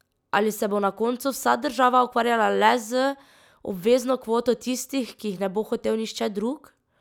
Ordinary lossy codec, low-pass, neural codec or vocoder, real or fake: none; 19.8 kHz; none; real